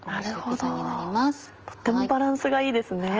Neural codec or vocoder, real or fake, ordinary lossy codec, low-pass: none; real; Opus, 24 kbps; 7.2 kHz